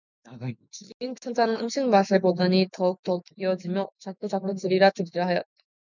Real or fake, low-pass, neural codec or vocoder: fake; 7.2 kHz; autoencoder, 48 kHz, 128 numbers a frame, DAC-VAE, trained on Japanese speech